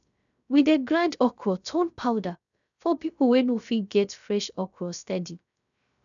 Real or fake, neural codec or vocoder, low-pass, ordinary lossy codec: fake; codec, 16 kHz, 0.3 kbps, FocalCodec; 7.2 kHz; none